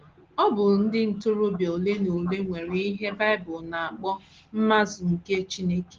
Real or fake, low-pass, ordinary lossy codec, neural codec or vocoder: real; 7.2 kHz; Opus, 16 kbps; none